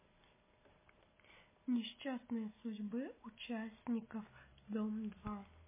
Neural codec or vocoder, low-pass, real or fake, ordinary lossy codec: none; 3.6 kHz; real; MP3, 16 kbps